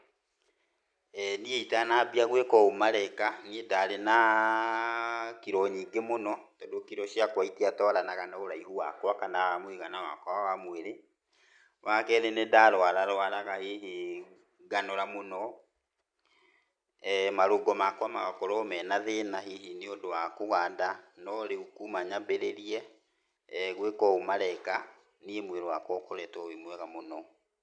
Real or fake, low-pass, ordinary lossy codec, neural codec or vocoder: real; 9.9 kHz; none; none